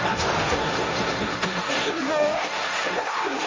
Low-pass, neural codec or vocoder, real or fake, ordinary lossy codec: 7.2 kHz; codec, 16 kHz, 1.1 kbps, Voila-Tokenizer; fake; Opus, 32 kbps